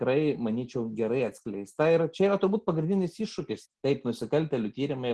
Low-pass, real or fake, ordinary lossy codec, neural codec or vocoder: 10.8 kHz; real; Opus, 16 kbps; none